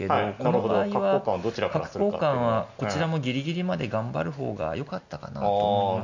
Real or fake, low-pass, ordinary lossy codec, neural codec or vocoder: real; 7.2 kHz; none; none